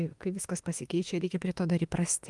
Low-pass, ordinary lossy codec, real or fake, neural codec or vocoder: 10.8 kHz; Opus, 24 kbps; fake; autoencoder, 48 kHz, 32 numbers a frame, DAC-VAE, trained on Japanese speech